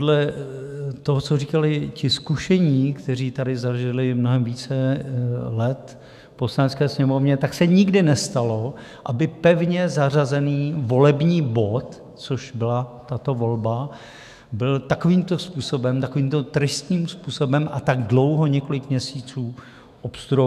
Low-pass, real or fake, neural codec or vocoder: 14.4 kHz; fake; autoencoder, 48 kHz, 128 numbers a frame, DAC-VAE, trained on Japanese speech